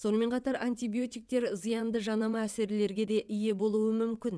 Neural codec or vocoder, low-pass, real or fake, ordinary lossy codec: vocoder, 22.05 kHz, 80 mel bands, WaveNeXt; none; fake; none